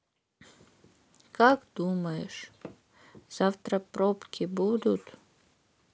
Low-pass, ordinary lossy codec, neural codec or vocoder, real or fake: none; none; none; real